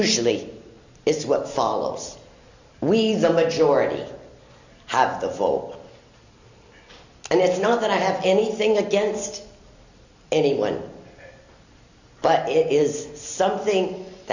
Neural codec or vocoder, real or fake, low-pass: none; real; 7.2 kHz